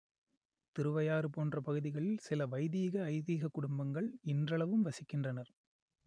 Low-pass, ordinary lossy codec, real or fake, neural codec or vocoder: 9.9 kHz; none; real; none